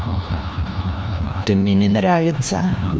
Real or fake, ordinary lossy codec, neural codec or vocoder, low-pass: fake; none; codec, 16 kHz, 1 kbps, FunCodec, trained on LibriTTS, 50 frames a second; none